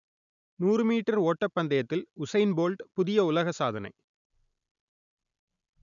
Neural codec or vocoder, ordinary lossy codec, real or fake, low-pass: none; none; real; 7.2 kHz